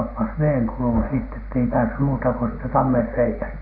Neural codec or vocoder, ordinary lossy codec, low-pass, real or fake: none; none; 5.4 kHz; real